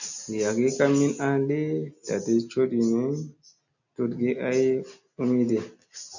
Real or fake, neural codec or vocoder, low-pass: real; none; 7.2 kHz